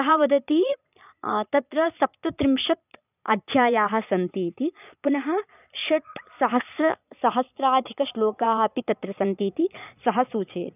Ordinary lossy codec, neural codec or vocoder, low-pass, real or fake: none; vocoder, 22.05 kHz, 80 mel bands, Vocos; 3.6 kHz; fake